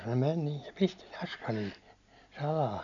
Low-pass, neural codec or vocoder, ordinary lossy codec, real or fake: 7.2 kHz; none; none; real